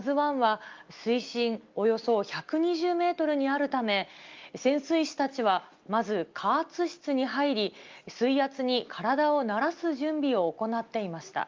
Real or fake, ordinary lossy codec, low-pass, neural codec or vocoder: real; Opus, 24 kbps; 7.2 kHz; none